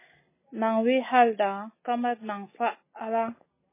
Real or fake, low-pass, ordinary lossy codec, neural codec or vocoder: real; 3.6 kHz; MP3, 16 kbps; none